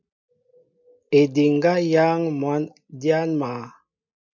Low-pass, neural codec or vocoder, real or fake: 7.2 kHz; none; real